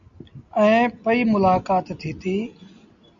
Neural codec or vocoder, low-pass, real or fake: none; 7.2 kHz; real